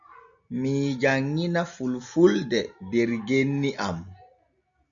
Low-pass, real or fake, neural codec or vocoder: 7.2 kHz; real; none